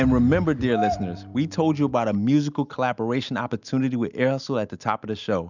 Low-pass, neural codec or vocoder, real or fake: 7.2 kHz; none; real